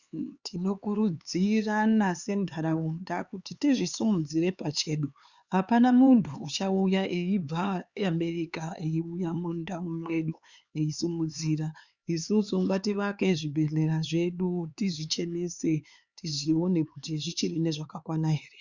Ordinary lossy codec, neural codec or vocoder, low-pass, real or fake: Opus, 64 kbps; codec, 16 kHz, 4 kbps, X-Codec, HuBERT features, trained on LibriSpeech; 7.2 kHz; fake